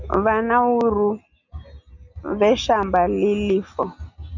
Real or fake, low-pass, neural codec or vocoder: real; 7.2 kHz; none